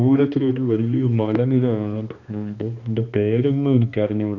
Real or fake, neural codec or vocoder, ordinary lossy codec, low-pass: fake; codec, 16 kHz, 2 kbps, X-Codec, HuBERT features, trained on balanced general audio; none; 7.2 kHz